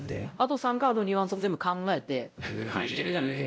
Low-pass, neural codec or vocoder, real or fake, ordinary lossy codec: none; codec, 16 kHz, 0.5 kbps, X-Codec, WavLM features, trained on Multilingual LibriSpeech; fake; none